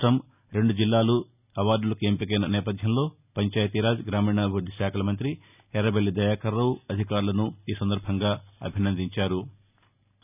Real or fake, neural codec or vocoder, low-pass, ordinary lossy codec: real; none; 3.6 kHz; none